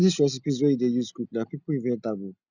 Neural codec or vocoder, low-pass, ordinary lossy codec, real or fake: none; 7.2 kHz; none; real